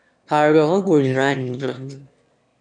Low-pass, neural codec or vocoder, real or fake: 9.9 kHz; autoencoder, 22.05 kHz, a latent of 192 numbers a frame, VITS, trained on one speaker; fake